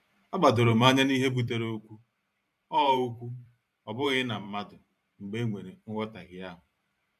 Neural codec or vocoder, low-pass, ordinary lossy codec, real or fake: vocoder, 44.1 kHz, 128 mel bands every 512 samples, BigVGAN v2; 14.4 kHz; MP3, 96 kbps; fake